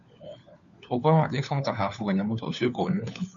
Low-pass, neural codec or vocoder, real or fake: 7.2 kHz; codec, 16 kHz, 4 kbps, FunCodec, trained on LibriTTS, 50 frames a second; fake